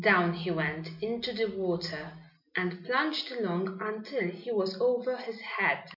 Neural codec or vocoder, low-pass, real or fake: none; 5.4 kHz; real